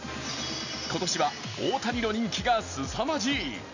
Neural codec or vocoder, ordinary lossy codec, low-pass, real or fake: none; none; 7.2 kHz; real